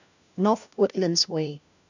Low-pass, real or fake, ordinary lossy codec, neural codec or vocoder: 7.2 kHz; fake; none; codec, 16 kHz, 1 kbps, FunCodec, trained on LibriTTS, 50 frames a second